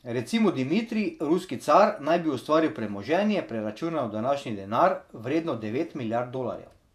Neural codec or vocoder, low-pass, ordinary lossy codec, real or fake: vocoder, 48 kHz, 128 mel bands, Vocos; 14.4 kHz; none; fake